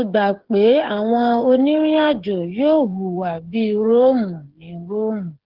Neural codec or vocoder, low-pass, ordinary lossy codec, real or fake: codec, 16 kHz, 8 kbps, FreqCodec, smaller model; 5.4 kHz; Opus, 16 kbps; fake